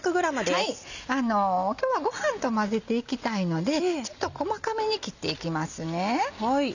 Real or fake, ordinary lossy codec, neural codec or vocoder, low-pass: real; none; none; 7.2 kHz